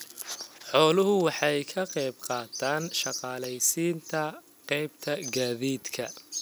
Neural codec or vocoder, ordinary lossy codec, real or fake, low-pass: none; none; real; none